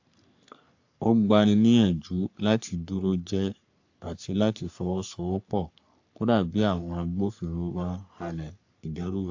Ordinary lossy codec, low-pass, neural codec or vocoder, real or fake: AAC, 48 kbps; 7.2 kHz; codec, 44.1 kHz, 3.4 kbps, Pupu-Codec; fake